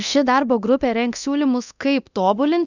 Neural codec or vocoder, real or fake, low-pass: codec, 24 kHz, 0.9 kbps, DualCodec; fake; 7.2 kHz